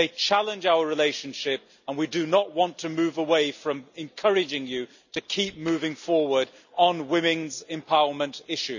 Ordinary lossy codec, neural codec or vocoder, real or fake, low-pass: none; none; real; 7.2 kHz